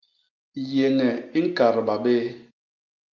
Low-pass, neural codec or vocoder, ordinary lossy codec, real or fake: 7.2 kHz; none; Opus, 32 kbps; real